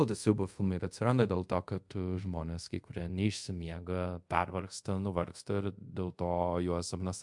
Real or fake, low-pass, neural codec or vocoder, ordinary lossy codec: fake; 10.8 kHz; codec, 24 kHz, 0.5 kbps, DualCodec; MP3, 64 kbps